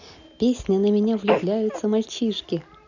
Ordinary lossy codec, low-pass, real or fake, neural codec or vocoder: none; 7.2 kHz; real; none